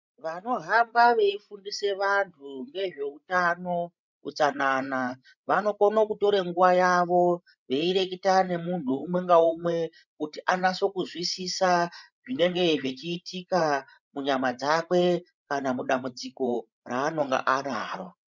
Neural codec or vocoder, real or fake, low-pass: codec, 16 kHz, 16 kbps, FreqCodec, larger model; fake; 7.2 kHz